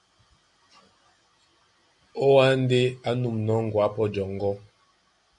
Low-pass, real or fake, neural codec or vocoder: 10.8 kHz; real; none